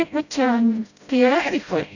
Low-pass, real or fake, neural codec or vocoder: 7.2 kHz; fake; codec, 16 kHz, 0.5 kbps, FreqCodec, smaller model